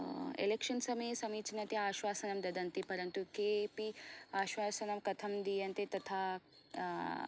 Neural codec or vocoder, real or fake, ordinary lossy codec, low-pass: none; real; none; none